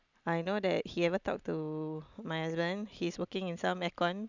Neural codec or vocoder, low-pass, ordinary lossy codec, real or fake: none; 7.2 kHz; none; real